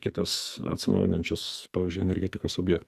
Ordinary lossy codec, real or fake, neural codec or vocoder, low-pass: Opus, 64 kbps; fake; codec, 44.1 kHz, 2.6 kbps, SNAC; 14.4 kHz